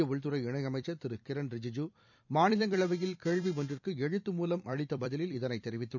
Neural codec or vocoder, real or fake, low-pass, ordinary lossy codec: vocoder, 44.1 kHz, 128 mel bands every 256 samples, BigVGAN v2; fake; 7.2 kHz; none